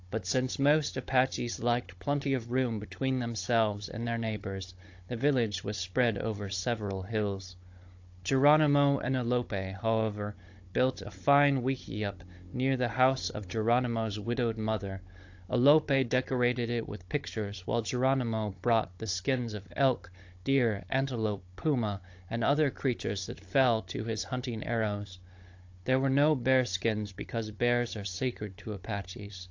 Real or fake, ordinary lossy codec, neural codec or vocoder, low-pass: fake; AAC, 48 kbps; codec, 16 kHz, 16 kbps, FunCodec, trained on Chinese and English, 50 frames a second; 7.2 kHz